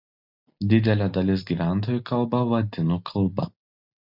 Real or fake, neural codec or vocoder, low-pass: real; none; 5.4 kHz